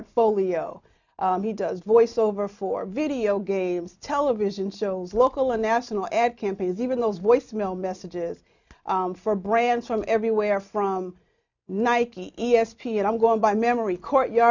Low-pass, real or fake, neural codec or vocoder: 7.2 kHz; real; none